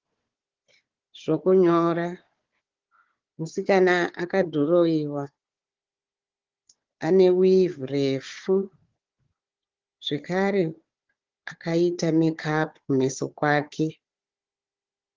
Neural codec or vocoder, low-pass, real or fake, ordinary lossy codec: codec, 16 kHz, 4 kbps, FunCodec, trained on Chinese and English, 50 frames a second; 7.2 kHz; fake; Opus, 16 kbps